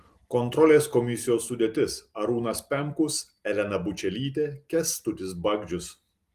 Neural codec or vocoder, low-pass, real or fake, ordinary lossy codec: none; 14.4 kHz; real; Opus, 24 kbps